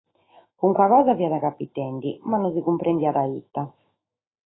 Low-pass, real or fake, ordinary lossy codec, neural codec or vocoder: 7.2 kHz; fake; AAC, 16 kbps; autoencoder, 48 kHz, 128 numbers a frame, DAC-VAE, trained on Japanese speech